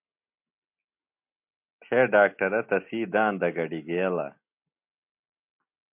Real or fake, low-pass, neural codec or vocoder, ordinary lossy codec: real; 3.6 kHz; none; MP3, 32 kbps